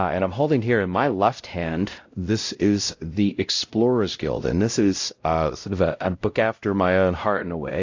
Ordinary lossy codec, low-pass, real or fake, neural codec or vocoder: AAC, 48 kbps; 7.2 kHz; fake; codec, 16 kHz, 0.5 kbps, X-Codec, WavLM features, trained on Multilingual LibriSpeech